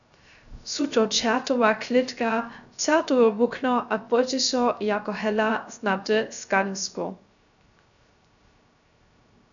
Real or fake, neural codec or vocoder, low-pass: fake; codec, 16 kHz, 0.3 kbps, FocalCodec; 7.2 kHz